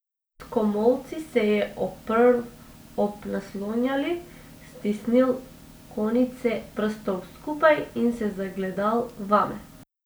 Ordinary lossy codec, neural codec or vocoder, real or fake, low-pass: none; none; real; none